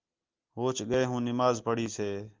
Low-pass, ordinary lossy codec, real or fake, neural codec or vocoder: 7.2 kHz; Opus, 32 kbps; real; none